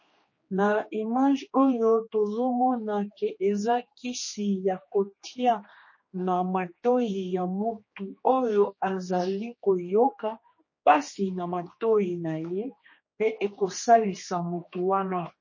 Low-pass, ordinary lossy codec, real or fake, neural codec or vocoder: 7.2 kHz; MP3, 32 kbps; fake; codec, 16 kHz, 2 kbps, X-Codec, HuBERT features, trained on general audio